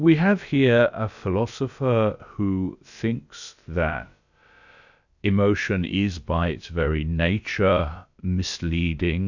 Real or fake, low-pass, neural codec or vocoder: fake; 7.2 kHz; codec, 16 kHz, about 1 kbps, DyCAST, with the encoder's durations